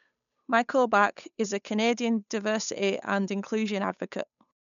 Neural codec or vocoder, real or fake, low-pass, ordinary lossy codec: codec, 16 kHz, 8 kbps, FunCodec, trained on Chinese and English, 25 frames a second; fake; 7.2 kHz; MP3, 96 kbps